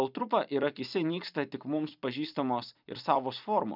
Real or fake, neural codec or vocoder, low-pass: fake; vocoder, 44.1 kHz, 128 mel bands every 256 samples, BigVGAN v2; 5.4 kHz